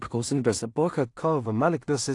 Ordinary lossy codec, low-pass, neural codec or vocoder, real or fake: AAC, 48 kbps; 10.8 kHz; codec, 16 kHz in and 24 kHz out, 0.4 kbps, LongCat-Audio-Codec, two codebook decoder; fake